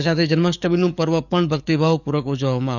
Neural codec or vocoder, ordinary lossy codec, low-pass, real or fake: codec, 44.1 kHz, 7.8 kbps, DAC; none; 7.2 kHz; fake